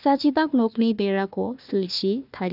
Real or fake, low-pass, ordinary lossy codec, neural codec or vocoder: fake; 5.4 kHz; none; codec, 16 kHz, 1 kbps, FunCodec, trained on Chinese and English, 50 frames a second